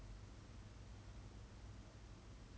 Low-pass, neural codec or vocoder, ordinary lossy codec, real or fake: none; none; none; real